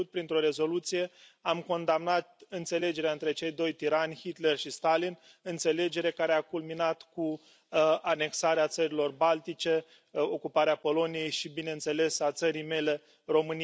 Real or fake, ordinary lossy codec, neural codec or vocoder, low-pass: real; none; none; none